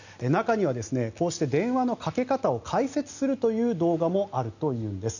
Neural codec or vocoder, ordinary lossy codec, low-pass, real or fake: none; none; 7.2 kHz; real